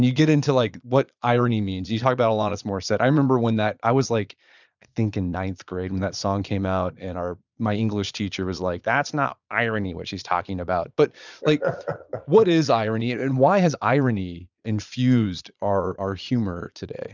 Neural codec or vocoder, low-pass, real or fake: vocoder, 22.05 kHz, 80 mel bands, Vocos; 7.2 kHz; fake